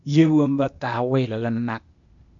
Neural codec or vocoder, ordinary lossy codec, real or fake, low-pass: codec, 16 kHz, 0.8 kbps, ZipCodec; MP3, 64 kbps; fake; 7.2 kHz